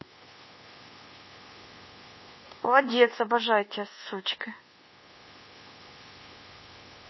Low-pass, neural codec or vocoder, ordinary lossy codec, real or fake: 7.2 kHz; codec, 24 kHz, 1.2 kbps, DualCodec; MP3, 24 kbps; fake